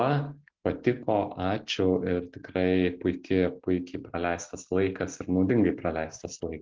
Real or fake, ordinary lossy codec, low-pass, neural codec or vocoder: real; Opus, 16 kbps; 7.2 kHz; none